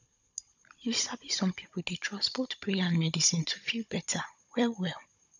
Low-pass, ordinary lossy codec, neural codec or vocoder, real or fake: 7.2 kHz; none; codec, 16 kHz, 16 kbps, FunCodec, trained on Chinese and English, 50 frames a second; fake